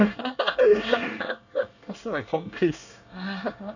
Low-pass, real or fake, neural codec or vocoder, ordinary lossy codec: 7.2 kHz; fake; codec, 24 kHz, 1 kbps, SNAC; none